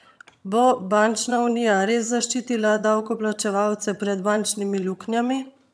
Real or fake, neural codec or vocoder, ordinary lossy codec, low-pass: fake; vocoder, 22.05 kHz, 80 mel bands, HiFi-GAN; none; none